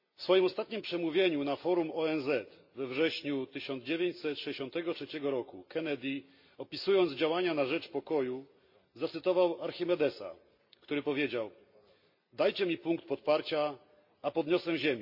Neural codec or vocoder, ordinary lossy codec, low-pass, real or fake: none; MP3, 32 kbps; 5.4 kHz; real